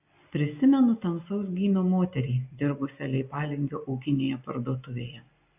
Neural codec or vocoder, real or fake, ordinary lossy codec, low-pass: none; real; AAC, 32 kbps; 3.6 kHz